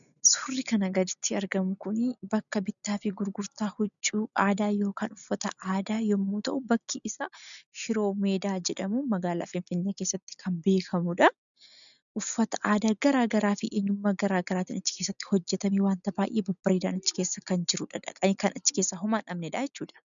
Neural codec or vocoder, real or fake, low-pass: none; real; 7.2 kHz